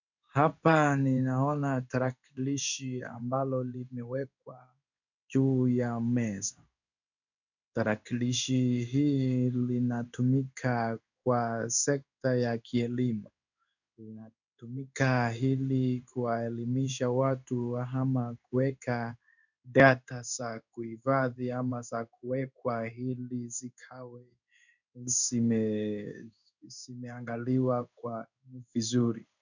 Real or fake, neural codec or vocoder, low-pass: fake; codec, 16 kHz in and 24 kHz out, 1 kbps, XY-Tokenizer; 7.2 kHz